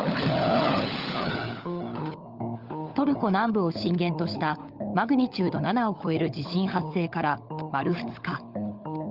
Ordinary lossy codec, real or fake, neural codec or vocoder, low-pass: Opus, 24 kbps; fake; codec, 16 kHz, 16 kbps, FunCodec, trained on LibriTTS, 50 frames a second; 5.4 kHz